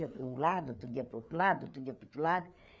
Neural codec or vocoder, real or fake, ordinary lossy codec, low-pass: codec, 16 kHz, 4 kbps, FreqCodec, larger model; fake; none; none